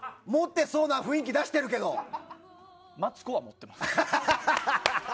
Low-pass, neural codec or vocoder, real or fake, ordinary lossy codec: none; none; real; none